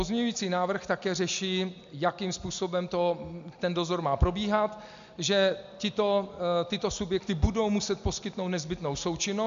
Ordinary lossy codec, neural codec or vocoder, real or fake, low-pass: MP3, 64 kbps; none; real; 7.2 kHz